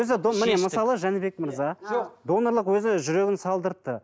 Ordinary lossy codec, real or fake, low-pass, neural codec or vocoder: none; real; none; none